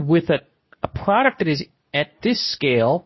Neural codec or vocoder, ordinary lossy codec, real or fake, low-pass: codec, 24 kHz, 0.9 kbps, WavTokenizer, medium speech release version 2; MP3, 24 kbps; fake; 7.2 kHz